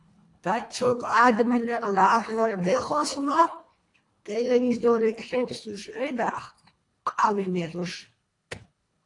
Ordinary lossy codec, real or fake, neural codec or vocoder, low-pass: AAC, 48 kbps; fake; codec, 24 kHz, 1.5 kbps, HILCodec; 10.8 kHz